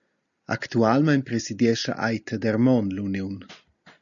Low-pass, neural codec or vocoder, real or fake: 7.2 kHz; none; real